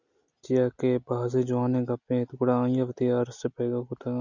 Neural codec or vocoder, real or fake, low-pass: none; real; 7.2 kHz